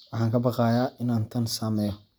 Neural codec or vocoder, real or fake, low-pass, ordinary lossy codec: none; real; none; none